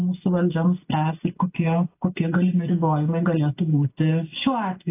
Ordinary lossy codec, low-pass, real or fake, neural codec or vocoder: AAC, 24 kbps; 3.6 kHz; real; none